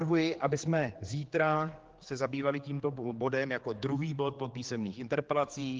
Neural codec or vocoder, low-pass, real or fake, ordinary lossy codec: codec, 16 kHz, 4 kbps, X-Codec, HuBERT features, trained on general audio; 7.2 kHz; fake; Opus, 16 kbps